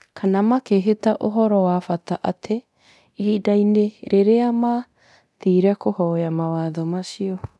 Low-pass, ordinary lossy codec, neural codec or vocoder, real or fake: none; none; codec, 24 kHz, 0.9 kbps, DualCodec; fake